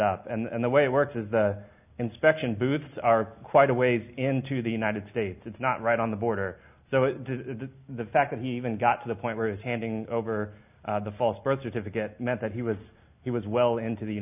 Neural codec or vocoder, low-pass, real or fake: none; 3.6 kHz; real